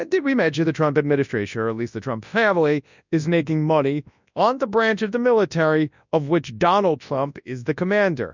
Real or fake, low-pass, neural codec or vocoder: fake; 7.2 kHz; codec, 24 kHz, 0.9 kbps, WavTokenizer, large speech release